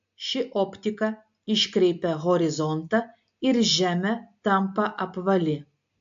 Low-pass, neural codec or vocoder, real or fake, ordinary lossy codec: 7.2 kHz; none; real; AAC, 64 kbps